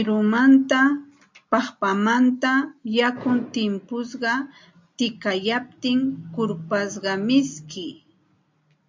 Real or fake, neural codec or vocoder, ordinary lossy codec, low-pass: real; none; MP3, 64 kbps; 7.2 kHz